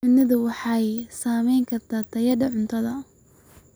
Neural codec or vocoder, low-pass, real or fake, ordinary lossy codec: none; none; real; none